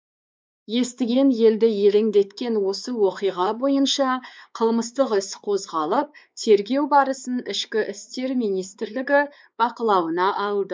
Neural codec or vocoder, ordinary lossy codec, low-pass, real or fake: codec, 16 kHz, 4 kbps, X-Codec, WavLM features, trained on Multilingual LibriSpeech; none; none; fake